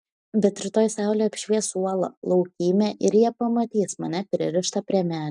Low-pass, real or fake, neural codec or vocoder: 10.8 kHz; real; none